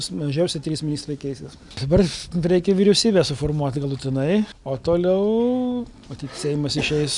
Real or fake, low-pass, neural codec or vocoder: real; 10.8 kHz; none